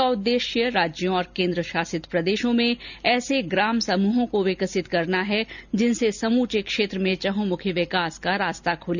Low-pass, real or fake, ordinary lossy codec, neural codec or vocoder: 7.2 kHz; real; none; none